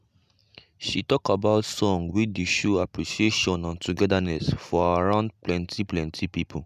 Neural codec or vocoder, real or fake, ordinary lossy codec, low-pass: none; real; none; 14.4 kHz